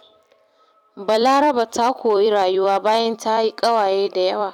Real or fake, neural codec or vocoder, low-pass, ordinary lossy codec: fake; vocoder, 44.1 kHz, 128 mel bands every 256 samples, BigVGAN v2; 19.8 kHz; none